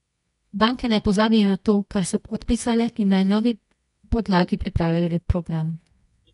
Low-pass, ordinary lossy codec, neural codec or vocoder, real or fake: 10.8 kHz; none; codec, 24 kHz, 0.9 kbps, WavTokenizer, medium music audio release; fake